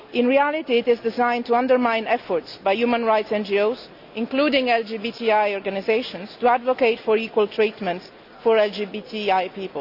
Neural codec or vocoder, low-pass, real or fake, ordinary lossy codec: none; 5.4 kHz; real; none